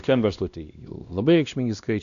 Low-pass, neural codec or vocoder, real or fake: 7.2 kHz; codec, 16 kHz, 1 kbps, X-Codec, WavLM features, trained on Multilingual LibriSpeech; fake